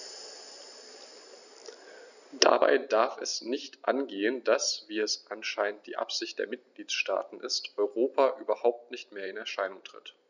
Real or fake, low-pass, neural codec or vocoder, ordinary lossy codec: real; 7.2 kHz; none; none